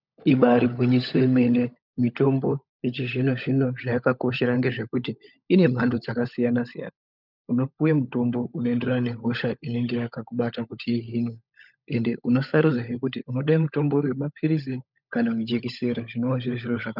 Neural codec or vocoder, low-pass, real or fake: codec, 16 kHz, 16 kbps, FunCodec, trained on LibriTTS, 50 frames a second; 5.4 kHz; fake